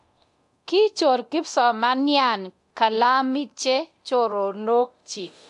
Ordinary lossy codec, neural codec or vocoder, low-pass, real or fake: AAC, 64 kbps; codec, 24 kHz, 0.9 kbps, DualCodec; 10.8 kHz; fake